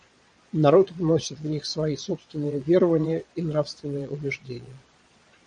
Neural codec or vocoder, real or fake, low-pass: vocoder, 22.05 kHz, 80 mel bands, Vocos; fake; 9.9 kHz